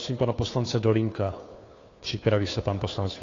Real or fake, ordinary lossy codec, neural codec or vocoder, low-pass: fake; AAC, 32 kbps; codec, 16 kHz, 2 kbps, FunCodec, trained on Chinese and English, 25 frames a second; 7.2 kHz